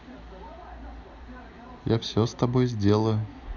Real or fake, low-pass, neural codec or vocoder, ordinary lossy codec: real; 7.2 kHz; none; none